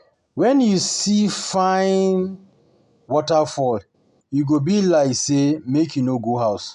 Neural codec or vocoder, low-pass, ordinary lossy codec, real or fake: none; 10.8 kHz; none; real